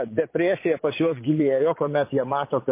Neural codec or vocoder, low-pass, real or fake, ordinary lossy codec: vocoder, 22.05 kHz, 80 mel bands, Vocos; 3.6 kHz; fake; MP3, 24 kbps